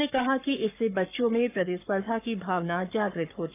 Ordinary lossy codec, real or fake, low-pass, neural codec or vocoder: none; fake; 3.6 kHz; codec, 44.1 kHz, 7.8 kbps, Pupu-Codec